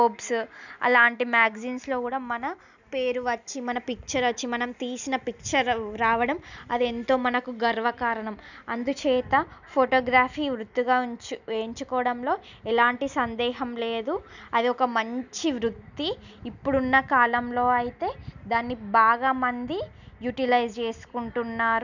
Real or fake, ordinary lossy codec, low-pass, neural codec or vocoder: real; none; 7.2 kHz; none